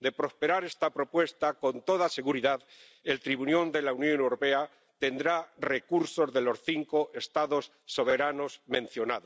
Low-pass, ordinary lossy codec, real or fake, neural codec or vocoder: none; none; real; none